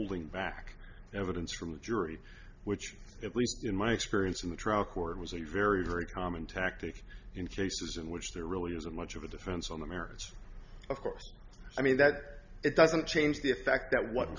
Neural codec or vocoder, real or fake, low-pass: none; real; 7.2 kHz